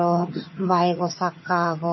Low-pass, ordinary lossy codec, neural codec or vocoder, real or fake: 7.2 kHz; MP3, 24 kbps; vocoder, 22.05 kHz, 80 mel bands, HiFi-GAN; fake